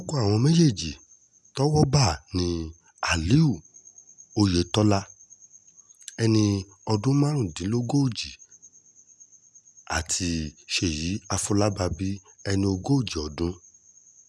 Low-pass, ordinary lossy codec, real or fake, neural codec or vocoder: none; none; real; none